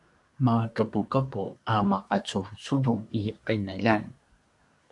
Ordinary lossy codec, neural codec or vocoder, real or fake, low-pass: AAC, 64 kbps; codec, 24 kHz, 1 kbps, SNAC; fake; 10.8 kHz